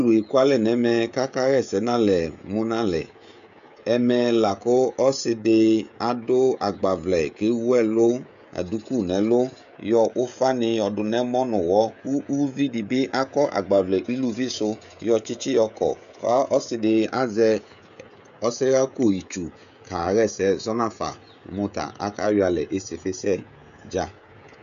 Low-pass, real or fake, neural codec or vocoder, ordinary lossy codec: 7.2 kHz; fake; codec, 16 kHz, 16 kbps, FreqCodec, smaller model; MP3, 96 kbps